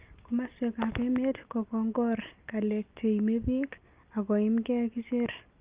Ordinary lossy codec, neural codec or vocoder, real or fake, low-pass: Opus, 32 kbps; none; real; 3.6 kHz